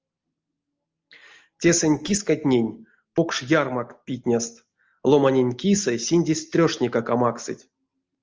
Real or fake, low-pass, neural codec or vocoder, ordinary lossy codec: real; 7.2 kHz; none; Opus, 24 kbps